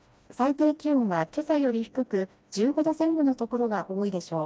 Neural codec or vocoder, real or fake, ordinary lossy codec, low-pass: codec, 16 kHz, 1 kbps, FreqCodec, smaller model; fake; none; none